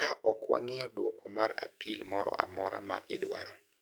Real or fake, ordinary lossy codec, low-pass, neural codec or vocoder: fake; none; none; codec, 44.1 kHz, 2.6 kbps, SNAC